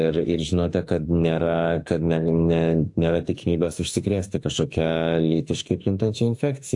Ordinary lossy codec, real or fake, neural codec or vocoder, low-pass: AAC, 64 kbps; fake; autoencoder, 48 kHz, 32 numbers a frame, DAC-VAE, trained on Japanese speech; 10.8 kHz